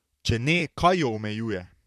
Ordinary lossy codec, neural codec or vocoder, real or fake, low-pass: none; vocoder, 44.1 kHz, 128 mel bands, Pupu-Vocoder; fake; 14.4 kHz